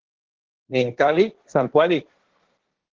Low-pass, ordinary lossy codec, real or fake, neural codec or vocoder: 7.2 kHz; Opus, 16 kbps; fake; codec, 16 kHz, 1.1 kbps, Voila-Tokenizer